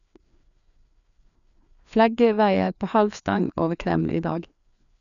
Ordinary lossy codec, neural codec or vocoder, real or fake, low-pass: none; codec, 16 kHz, 2 kbps, FreqCodec, larger model; fake; 7.2 kHz